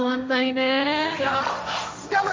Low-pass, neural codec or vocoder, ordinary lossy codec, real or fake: none; codec, 16 kHz, 1.1 kbps, Voila-Tokenizer; none; fake